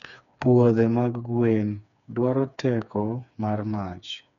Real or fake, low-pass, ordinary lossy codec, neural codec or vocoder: fake; 7.2 kHz; none; codec, 16 kHz, 4 kbps, FreqCodec, smaller model